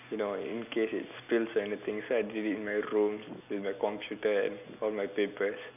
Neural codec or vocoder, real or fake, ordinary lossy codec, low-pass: none; real; none; 3.6 kHz